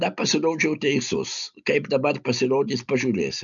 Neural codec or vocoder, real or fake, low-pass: none; real; 7.2 kHz